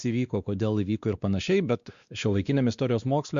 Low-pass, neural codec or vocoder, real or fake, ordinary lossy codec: 7.2 kHz; codec, 16 kHz, 2 kbps, X-Codec, WavLM features, trained on Multilingual LibriSpeech; fake; Opus, 64 kbps